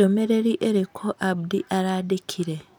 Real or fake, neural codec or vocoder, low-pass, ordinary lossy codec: real; none; none; none